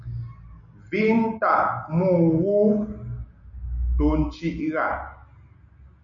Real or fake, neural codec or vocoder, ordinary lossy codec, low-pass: real; none; MP3, 64 kbps; 7.2 kHz